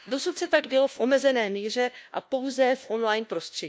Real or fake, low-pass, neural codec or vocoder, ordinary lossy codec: fake; none; codec, 16 kHz, 1 kbps, FunCodec, trained on LibriTTS, 50 frames a second; none